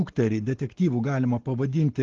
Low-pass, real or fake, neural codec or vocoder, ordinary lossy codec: 7.2 kHz; real; none; Opus, 16 kbps